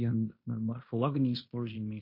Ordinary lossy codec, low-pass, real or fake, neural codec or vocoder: MP3, 32 kbps; 5.4 kHz; fake; codec, 16 kHz in and 24 kHz out, 0.9 kbps, LongCat-Audio-Codec, fine tuned four codebook decoder